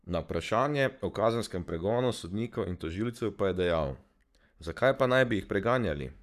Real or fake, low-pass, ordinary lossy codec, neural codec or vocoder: fake; 14.4 kHz; none; codec, 44.1 kHz, 7.8 kbps, Pupu-Codec